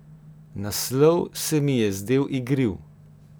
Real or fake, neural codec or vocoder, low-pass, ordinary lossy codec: real; none; none; none